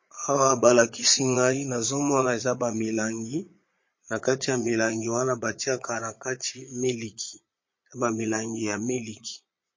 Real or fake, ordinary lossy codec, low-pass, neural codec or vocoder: fake; MP3, 32 kbps; 7.2 kHz; vocoder, 22.05 kHz, 80 mel bands, Vocos